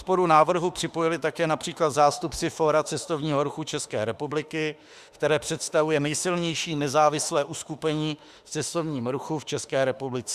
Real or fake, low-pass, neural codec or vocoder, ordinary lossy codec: fake; 14.4 kHz; autoencoder, 48 kHz, 32 numbers a frame, DAC-VAE, trained on Japanese speech; Opus, 64 kbps